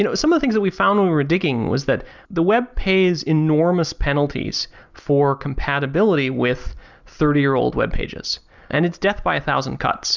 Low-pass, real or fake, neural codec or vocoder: 7.2 kHz; real; none